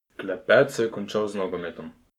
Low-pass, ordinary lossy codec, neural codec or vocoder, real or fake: 19.8 kHz; none; codec, 44.1 kHz, 7.8 kbps, Pupu-Codec; fake